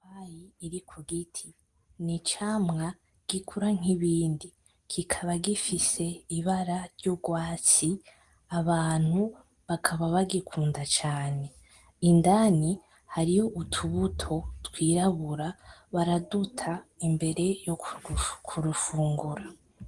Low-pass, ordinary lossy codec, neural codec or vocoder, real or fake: 10.8 kHz; Opus, 24 kbps; none; real